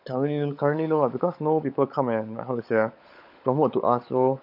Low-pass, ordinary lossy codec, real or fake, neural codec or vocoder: 5.4 kHz; none; fake; codec, 16 kHz, 16 kbps, FunCodec, trained on LibriTTS, 50 frames a second